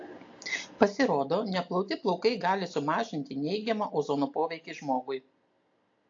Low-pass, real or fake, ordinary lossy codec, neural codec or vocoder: 7.2 kHz; real; AAC, 48 kbps; none